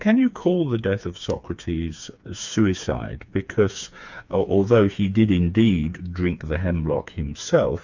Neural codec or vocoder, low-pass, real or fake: codec, 16 kHz, 4 kbps, FreqCodec, smaller model; 7.2 kHz; fake